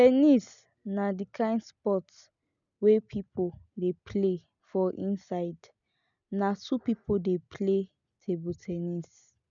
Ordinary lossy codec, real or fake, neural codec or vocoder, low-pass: none; real; none; 7.2 kHz